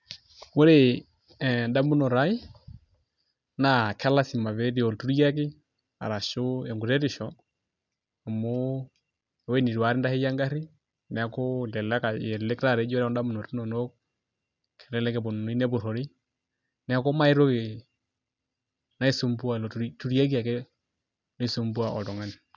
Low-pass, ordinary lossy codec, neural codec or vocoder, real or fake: 7.2 kHz; none; none; real